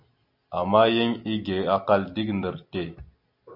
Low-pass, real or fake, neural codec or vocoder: 5.4 kHz; real; none